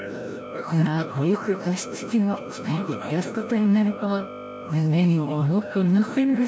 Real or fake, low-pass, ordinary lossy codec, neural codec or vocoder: fake; none; none; codec, 16 kHz, 0.5 kbps, FreqCodec, larger model